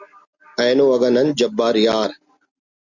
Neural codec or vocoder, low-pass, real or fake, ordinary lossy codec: none; 7.2 kHz; real; Opus, 64 kbps